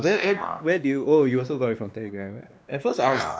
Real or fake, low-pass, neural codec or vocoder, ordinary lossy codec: fake; none; codec, 16 kHz, 2 kbps, X-Codec, WavLM features, trained on Multilingual LibriSpeech; none